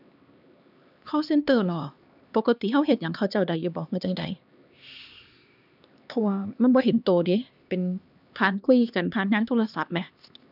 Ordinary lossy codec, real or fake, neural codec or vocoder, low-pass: none; fake; codec, 16 kHz, 2 kbps, X-Codec, HuBERT features, trained on LibriSpeech; 5.4 kHz